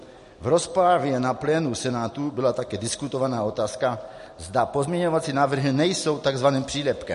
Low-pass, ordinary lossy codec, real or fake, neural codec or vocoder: 14.4 kHz; MP3, 48 kbps; real; none